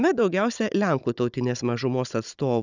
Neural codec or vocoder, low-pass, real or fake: codec, 16 kHz, 16 kbps, FunCodec, trained on Chinese and English, 50 frames a second; 7.2 kHz; fake